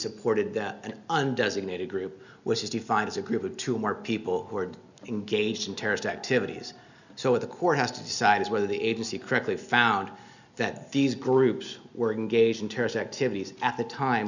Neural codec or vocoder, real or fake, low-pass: none; real; 7.2 kHz